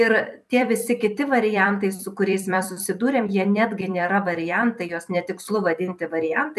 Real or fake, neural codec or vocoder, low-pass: fake; vocoder, 44.1 kHz, 128 mel bands every 256 samples, BigVGAN v2; 14.4 kHz